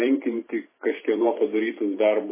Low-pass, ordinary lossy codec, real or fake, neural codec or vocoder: 3.6 kHz; MP3, 16 kbps; fake; vocoder, 44.1 kHz, 128 mel bands every 512 samples, BigVGAN v2